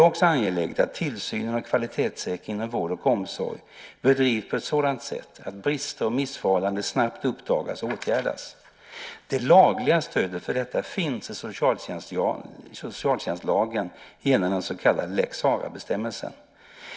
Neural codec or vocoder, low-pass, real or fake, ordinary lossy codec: none; none; real; none